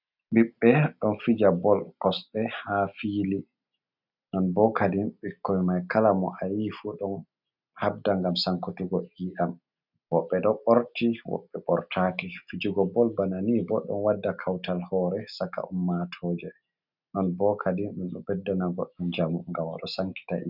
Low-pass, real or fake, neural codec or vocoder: 5.4 kHz; real; none